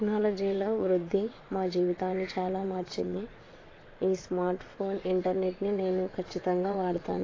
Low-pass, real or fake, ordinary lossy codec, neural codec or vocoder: 7.2 kHz; fake; AAC, 32 kbps; vocoder, 22.05 kHz, 80 mel bands, WaveNeXt